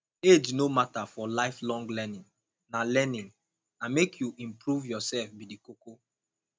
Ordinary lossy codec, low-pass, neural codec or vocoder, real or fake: none; none; none; real